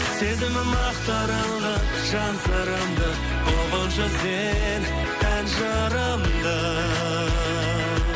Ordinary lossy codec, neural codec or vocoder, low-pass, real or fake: none; none; none; real